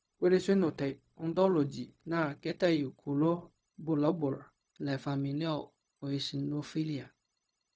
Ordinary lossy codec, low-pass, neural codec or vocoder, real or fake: none; none; codec, 16 kHz, 0.4 kbps, LongCat-Audio-Codec; fake